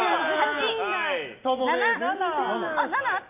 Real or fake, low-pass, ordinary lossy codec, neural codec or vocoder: real; 3.6 kHz; none; none